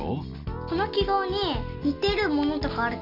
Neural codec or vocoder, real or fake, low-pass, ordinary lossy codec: none; real; 5.4 kHz; none